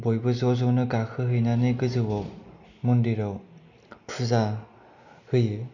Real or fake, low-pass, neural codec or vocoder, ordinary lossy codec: real; 7.2 kHz; none; none